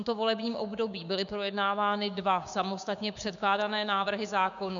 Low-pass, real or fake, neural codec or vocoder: 7.2 kHz; fake; codec, 16 kHz, 6 kbps, DAC